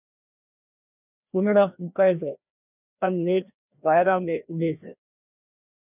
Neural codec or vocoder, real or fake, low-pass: codec, 16 kHz, 1 kbps, FreqCodec, larger model; fake; 3.6 kHz